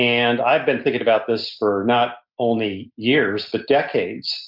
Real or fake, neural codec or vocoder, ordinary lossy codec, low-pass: real; none; MP3, 48 kbps; 5.4 kHz